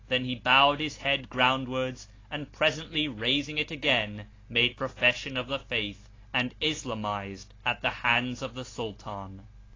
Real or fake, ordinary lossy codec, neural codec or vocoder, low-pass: real; AAC, 32 kbps; none; 7.2 kHz